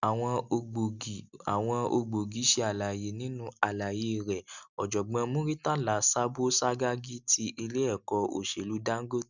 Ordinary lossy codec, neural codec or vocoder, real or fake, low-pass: none; none; real; 7.2 kHz